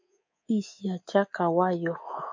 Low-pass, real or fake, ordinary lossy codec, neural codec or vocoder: 7.2 kHz; fake; MP3, 48 kbps; codec, 24 kHz, 3.1 kbps, DualCodec